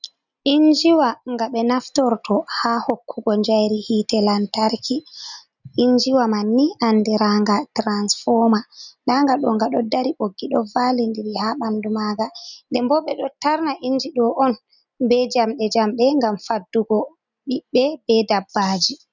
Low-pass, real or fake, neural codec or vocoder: 7.2 kHz; real; none